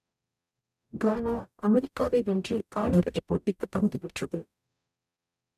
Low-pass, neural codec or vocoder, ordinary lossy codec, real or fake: 14.4 kHz; codec, 44.1 kHz, 0.9 kbps, DAC; none; fake